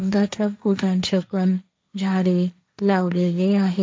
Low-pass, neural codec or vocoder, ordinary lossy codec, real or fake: none; codec, 16 kHz, 1.1 kbps, Voila-Tokenizer; none; fake